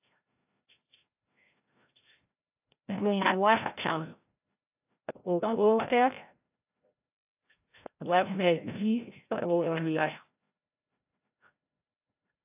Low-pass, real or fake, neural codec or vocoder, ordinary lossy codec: 3.6 kHz; fake; codec, 16 kHz, 0.5 kbps, FreqCodec, larger model; AAC, 32 kbps